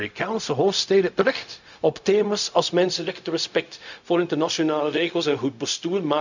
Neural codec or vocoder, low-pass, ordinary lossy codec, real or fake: codec, 16 kHz, 0.4 kbps, LongCat-Audio-Codec; 7.2 kHz; none; fake